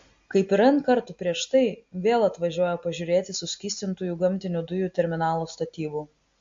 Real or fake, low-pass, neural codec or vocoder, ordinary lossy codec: real; 7.2 kHz; none; MP3, 48 kbps